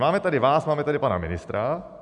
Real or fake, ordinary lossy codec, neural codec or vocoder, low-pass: real; MP3, 96 kbps; none; 10.8 kHz